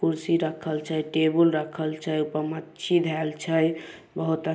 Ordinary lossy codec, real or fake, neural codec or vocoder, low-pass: none; real; none; none